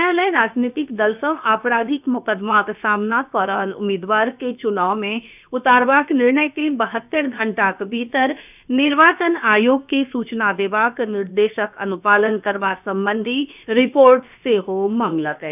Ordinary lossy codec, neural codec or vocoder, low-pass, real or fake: none; codec, 16 kHz, about 1 kbps, DyCAST, with the encoder's durations; 3.6 kHz; fake